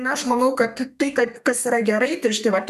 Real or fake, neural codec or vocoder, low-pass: fake; codec, 32 kHz, 1.9 kbps, SNAC; 14.4 kHz